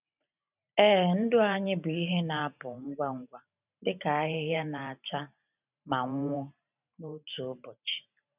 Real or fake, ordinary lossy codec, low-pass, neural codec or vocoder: fake; none; 3.6 kHz; vocoder, 44.1 kHz, 128 mel bands every 512 samples, BigVGAN v2